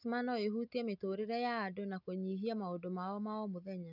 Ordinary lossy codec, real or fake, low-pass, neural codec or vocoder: none; real; 5.4 kHz; none